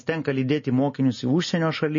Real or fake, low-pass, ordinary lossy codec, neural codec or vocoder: real; 7.2 kHz; MP3, 32 kbps; none